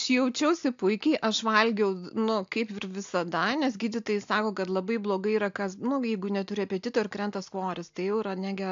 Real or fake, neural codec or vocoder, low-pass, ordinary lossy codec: real; none; 7.2 kHz; AAC, 64 kbps